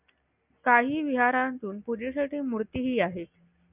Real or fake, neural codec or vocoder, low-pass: real; none; 3.6 kHz